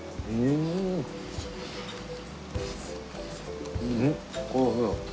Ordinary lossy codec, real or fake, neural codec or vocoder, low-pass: none; real; none; none